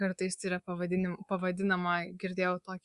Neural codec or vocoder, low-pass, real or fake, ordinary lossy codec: codec, 24 kHz, 3.1 kbps, DualCodec; 10.8 kHz; fake; Opus, 64 kbps